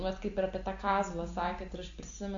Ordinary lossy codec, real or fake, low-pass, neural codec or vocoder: AAC, 48 kbps; real; 7.2 kHz; none